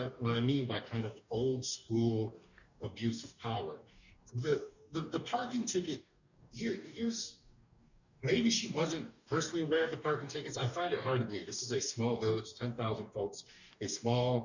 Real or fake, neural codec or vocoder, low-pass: fake; codec, 44.1 kHz, 2.6 kbps, DAC; 7.2 kHz